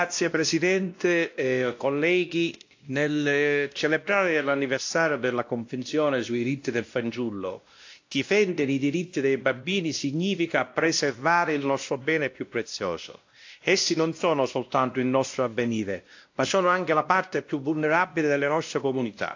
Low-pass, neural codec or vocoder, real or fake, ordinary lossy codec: 7.2 kHz; codec, 16 kHz, 1 kbps, X-Codec, WavLM features, trained on Multilingual LibriSpeech; fake; AAC, 48 kbps